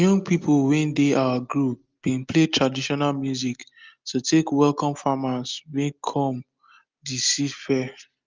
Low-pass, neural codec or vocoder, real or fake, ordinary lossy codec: 7.2 kHz; none; real; Opus, 24 kbps